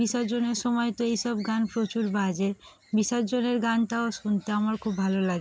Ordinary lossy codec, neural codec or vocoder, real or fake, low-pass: none; none; real; none